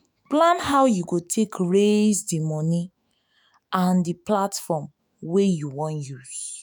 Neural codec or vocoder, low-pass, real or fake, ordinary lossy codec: autoencoder, 48 kHz, 128 numbers a frame, DAC-VAE, trained on Japanese speech; none; fake; none